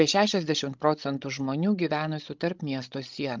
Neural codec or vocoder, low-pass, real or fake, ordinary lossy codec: codec, 16 kHz, 16 kbps, FreqCodec, larger model; 7.2 kHz; fake; Opus, 32 kbps